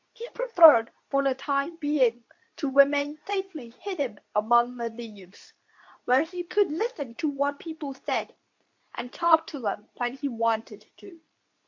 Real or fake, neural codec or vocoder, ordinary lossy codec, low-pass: fake; codec, 24 kHz, 0.9 kbps, WavTokenizer, medium speech release version 2; MP3, 48 kbps; 7.2 kHz